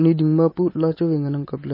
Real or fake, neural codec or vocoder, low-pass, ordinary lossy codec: real; none; 5.4 kHz; MP3, 24 kbps